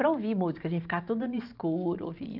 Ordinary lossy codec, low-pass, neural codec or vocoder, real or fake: none; 5.4 kHz; vocoder, 44.1 kHz, 128 mel bands every 512 samples, BigVGAN v2; fake